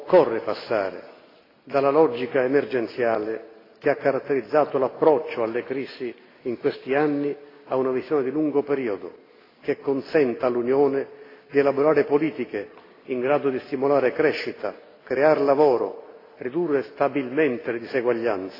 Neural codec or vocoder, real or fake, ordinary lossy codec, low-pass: none; real; AAC, 24 kbps; 5.4 kHz